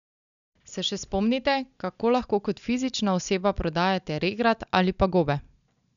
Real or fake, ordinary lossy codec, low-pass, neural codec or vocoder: real; none; 7.2 kHz; none